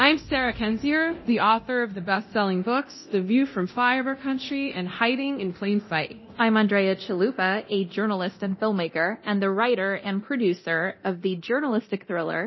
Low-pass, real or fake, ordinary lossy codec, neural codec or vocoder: 7.2 kHz; fake; MP3, 24 kbps; codec, 24 kHz, 0.9 kbps, DualCodec